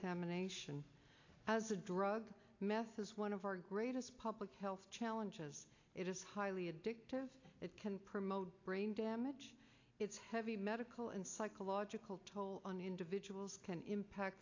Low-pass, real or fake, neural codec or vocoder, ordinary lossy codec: 7.2 kHz; real; none; AAC, 48 kbps